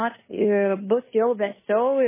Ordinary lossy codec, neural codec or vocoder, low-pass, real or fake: MP3, 16 kbps; codec, 16 kHz in and 24 kHz out, 0.9 kbps, LongCat-Audio-Codec, fine tuned four codebook decoder; 3.6 kHz; fake